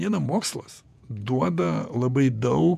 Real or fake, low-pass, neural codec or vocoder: fake; 14.4 kHz; vocoder, 48 kHz, 128 mel bands, Vocos